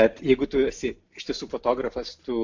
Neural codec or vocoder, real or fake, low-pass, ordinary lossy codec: none; real; 7.2 kHz; AAC, 48 kbps